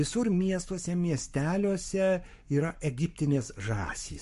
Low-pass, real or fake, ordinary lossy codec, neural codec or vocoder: 14.4 kHz; fake; MP3, 48 kbps; codec, 44.1 kHz, 7.8 kbps, Pupu-Codec